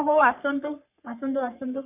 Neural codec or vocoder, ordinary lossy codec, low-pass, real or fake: codec, 44.1 kHz, 3.4 kbps, Pupu-Codec; none; 3.6 kHz; fake